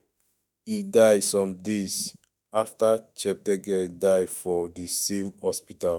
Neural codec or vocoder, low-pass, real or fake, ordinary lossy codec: autoencoder, 48 kHz, 32 numbers a frame, DAC-VAE, trained on Japanese speech; 19.8 kHz; fake; none